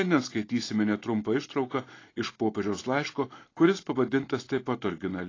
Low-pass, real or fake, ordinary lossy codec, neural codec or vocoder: 7.2 kHz; real; AAC, 32 kbps; none